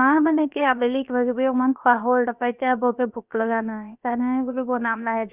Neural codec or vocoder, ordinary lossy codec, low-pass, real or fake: codec, 16 kHz, about 1 kbps, DyCAST, with the encoder's durations; Opus, 64 kbps; 3.6 kHz; fake